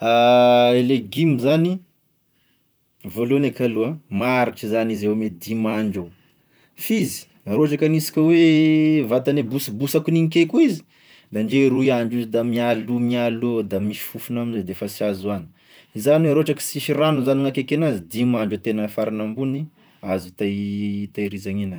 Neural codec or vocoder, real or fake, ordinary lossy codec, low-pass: vocoder, 44.1 kHz, 128 mel bands, Pupu-Vocoder; fake; none; none